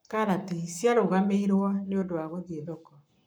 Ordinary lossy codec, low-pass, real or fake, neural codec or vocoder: none; none; fake; codec, 44.1 kHz, 7.8 kbps, Pupu-Codec